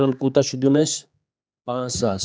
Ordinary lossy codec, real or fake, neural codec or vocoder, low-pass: none; fake; codec, 16 kHz, 4 kbps, X-Codec, HuBERT features, trained on general audio; none